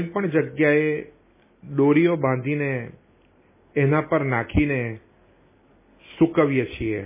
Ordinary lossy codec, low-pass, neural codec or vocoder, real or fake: MP3, 16 kbps; 3.6 kHz; none; real